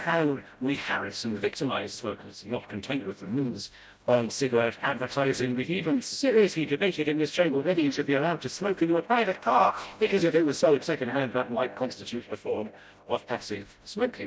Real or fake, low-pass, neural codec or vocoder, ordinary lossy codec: fake; none; codec, 16 kHz, 0.5 kbps, FreqCodec, smaller model; none